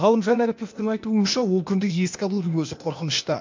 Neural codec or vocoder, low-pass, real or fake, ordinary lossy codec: codec, 16 kHz, 0.8 kbps, ZipCodec; 7.2 kHz; fake; MP3, 48 kbps